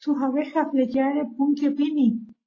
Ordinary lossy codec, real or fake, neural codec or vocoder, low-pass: AAC, 32 kbps; real; none; 7.2 kHz